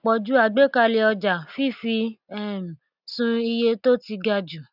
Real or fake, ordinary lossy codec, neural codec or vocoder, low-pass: real; none; none; 5.4 kHz